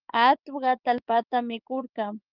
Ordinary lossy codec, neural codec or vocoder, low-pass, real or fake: Opus, 32 kbps; codec, 16 kHz, 6 kbps, DAC; 5.4 kHz; fake